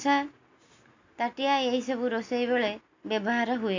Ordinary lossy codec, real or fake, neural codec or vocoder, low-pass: AAC, 48 kbps; real; none; 7.2 kHz